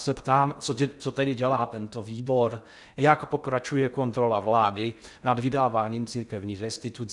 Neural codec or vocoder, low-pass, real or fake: codec, 16 kHz in and 24 kHz out, 0.6 kbps, FocalCodec, streaming, 2048 codes; 10.8 kHz; fake